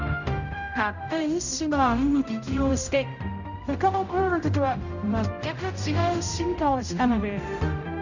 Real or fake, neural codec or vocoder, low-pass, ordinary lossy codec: fake; codec, 16 kHz, 0.5 kbps, X-Codec, HuBERT features, trained on general audio; 7.2 kHz; none